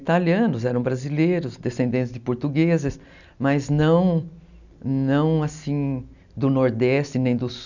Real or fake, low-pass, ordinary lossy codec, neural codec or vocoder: real; 7.2 kHz; none; none